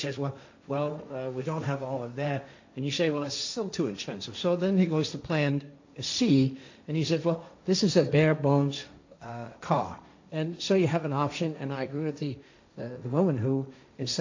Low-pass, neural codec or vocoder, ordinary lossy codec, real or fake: 7.2 kHz; codec, 16 kHz, 1.1 kbps, Voila-Tokenizer; AAC, 48 kbps; fake